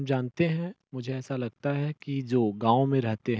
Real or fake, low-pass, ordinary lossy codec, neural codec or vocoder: real; none; none; none